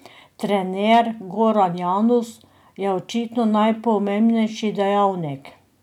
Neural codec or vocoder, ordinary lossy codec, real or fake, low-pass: none; none; real; 19.8 kHz